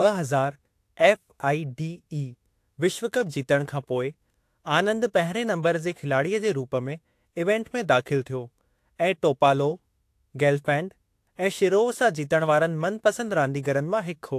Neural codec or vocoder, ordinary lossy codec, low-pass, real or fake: autoencoder, 48 kHz, 32 numbers a frame, DAC-VAE, trained on Japanese speech; AAC, 64 kbps; 14.4 kHz; fake